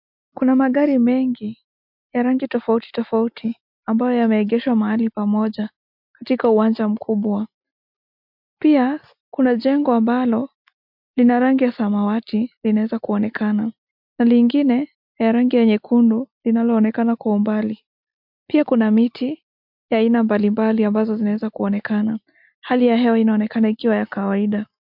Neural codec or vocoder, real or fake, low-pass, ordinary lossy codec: none; real; 5.4 kHz; MP3, 48 kbps